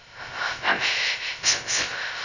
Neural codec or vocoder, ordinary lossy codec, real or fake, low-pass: codec, 16 kHz, 0.2 kbps, FocalCodec; none; fake; 7.2 kHz